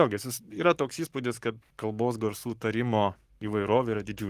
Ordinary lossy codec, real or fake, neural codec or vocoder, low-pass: Opus, 24 kbps; fake; codec, 44.1 kHz, 7.8 kbps, Pupu-Codec; 14.4 kHz